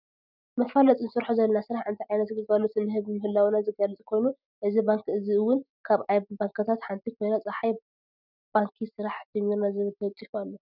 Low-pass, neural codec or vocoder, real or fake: 5.4 kHz; none; real